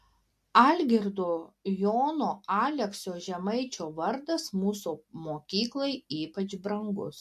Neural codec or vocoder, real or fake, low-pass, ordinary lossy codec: none; real; 14.4 kHz; MP3, 64 kbps